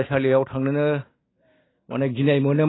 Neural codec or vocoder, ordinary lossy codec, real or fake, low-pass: none; AAC, 16 kbps; real; 7.2 kHz